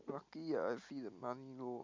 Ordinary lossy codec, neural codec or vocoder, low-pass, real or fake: MP3, 48 kbps; none; 7.2 kHz; real